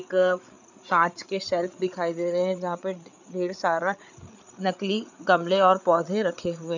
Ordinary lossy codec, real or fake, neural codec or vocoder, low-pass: none; fake; codec, 16 kHz, 8 kbps, FreqCodec, larger model; 7.2 kHz